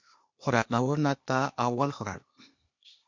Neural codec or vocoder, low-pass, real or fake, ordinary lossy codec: codec, 16 kHz, 0.8 kbps, ZipCodec; 7.2 kHz; fake; MP3, 48 kbps